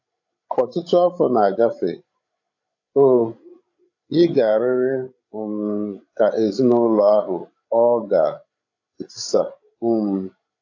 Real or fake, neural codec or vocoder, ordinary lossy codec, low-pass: fake; codec, 16 kHz, 16 kbps, FreqCodec, larger model; AAC, 48 kbps; 7.2 kHz